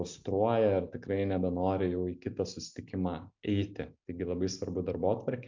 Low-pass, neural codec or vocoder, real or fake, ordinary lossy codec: 7.2 kHz; none; real; MP3, 64 kbps